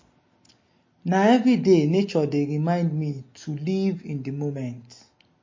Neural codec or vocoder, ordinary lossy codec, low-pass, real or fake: none; MP3, 32 kbps; 7.2 kHz; real